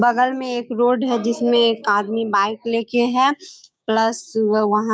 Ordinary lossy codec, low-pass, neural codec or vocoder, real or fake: none; none; codec, 16 kHz, 6 kbps, DAC; fake